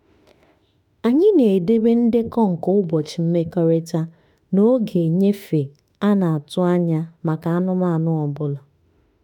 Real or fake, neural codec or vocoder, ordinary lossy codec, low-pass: fake; autoencoder, 48 kHz, 32 numbers a frame, DAC-VAE, trained on Japanese speech; none; 19.8 kHz